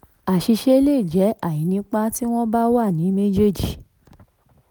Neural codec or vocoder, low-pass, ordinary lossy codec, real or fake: none; none; none; real